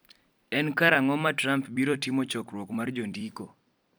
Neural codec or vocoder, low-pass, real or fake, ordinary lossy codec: vocoder, 44.1 kHz, 128 mel bands every 256 samples, BigVGAN v2; none; fake; none